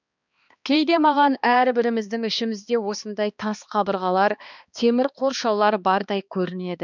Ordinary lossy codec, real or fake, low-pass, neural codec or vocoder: none; fake; 7.2 kHz; codec, 16 kHz, 2 kbps, X-Codec, HuBERT features, trained on balanced general audio